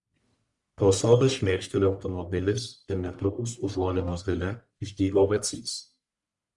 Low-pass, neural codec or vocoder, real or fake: 10.8 kHz; codec, 44.1 kHz, 1.7 kbps, Pupu-Codec; fake